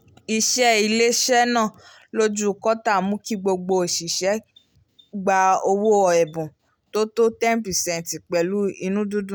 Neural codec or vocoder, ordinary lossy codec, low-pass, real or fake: none; none; none; real